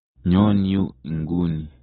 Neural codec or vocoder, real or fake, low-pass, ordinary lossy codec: none; real; 9.9 kHz; AAC, 16 kbps